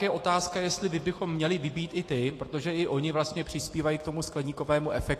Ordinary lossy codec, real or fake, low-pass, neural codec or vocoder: AAC, 48 kbps; fake; 14.4 kHz; autoencoder, 48 kHz, 128 numbers a frame, DAC-VAE, trained on Japanese speech